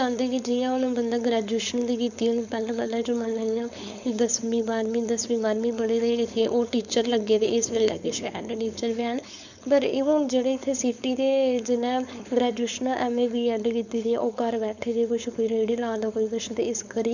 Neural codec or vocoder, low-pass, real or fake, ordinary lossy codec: codec, 16 kHz, 4.8 kbps, FACodec; 7.2 kHz; fake; none